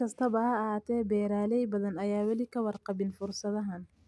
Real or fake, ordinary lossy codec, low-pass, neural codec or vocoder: real; none; none; none